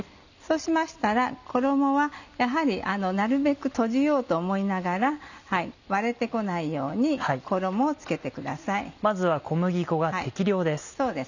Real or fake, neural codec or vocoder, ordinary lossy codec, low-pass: real; none; none; 7.2 kHz